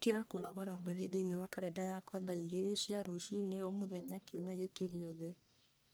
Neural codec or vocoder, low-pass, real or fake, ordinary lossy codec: codec, 44.1 kHz, 1.7 kbps, Pupu-Codec; none; fake; none